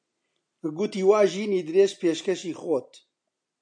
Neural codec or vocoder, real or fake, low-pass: none; real; 9.9 kHz